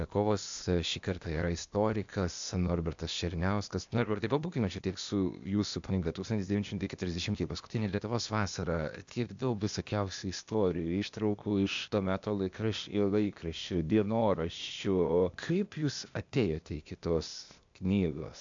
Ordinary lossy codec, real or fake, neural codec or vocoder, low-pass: MP3, 64 kbps; fake; codec, 16 kHz, 0.8 kbps, ZipCodec; 7.2 kHz